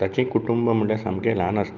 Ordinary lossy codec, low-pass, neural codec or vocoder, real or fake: Opus, 32 kbps; 7.2 kHz; none; real